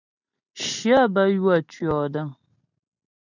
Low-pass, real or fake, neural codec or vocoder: 7.2 kHz; real; none